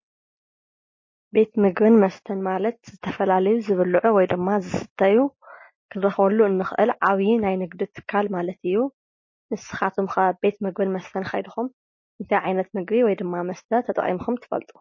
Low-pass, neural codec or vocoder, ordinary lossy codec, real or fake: 7.2 kHz; none; MP3, 32 kbps; real